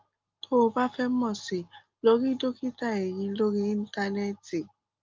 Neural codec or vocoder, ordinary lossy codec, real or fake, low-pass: none; Opus, 24 kbps; real; 7.2 kHz